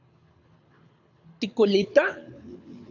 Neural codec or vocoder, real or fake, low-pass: codec, 24 kHz, 6 kbps, HILCodec; fake; 7.2 kHz